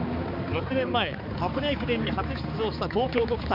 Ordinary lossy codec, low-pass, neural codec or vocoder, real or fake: MP3, 48 kbps; 5.4 kHz; codec, 16 kHz, 4 kbps, X-Codec, HuBERT features, trained on balanced general audio; fake